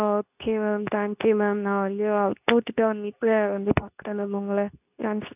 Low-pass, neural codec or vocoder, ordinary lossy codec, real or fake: 3.6 kHz; codec, 24 kHz, 0.9 kbps, WavTokenizer, medium speech release version 2; none; fake